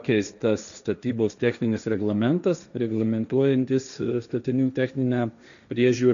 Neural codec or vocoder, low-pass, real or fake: codec, 16 kHz, 1.1 kbps, Voila-Tokenizer; 7.2 kHz; fake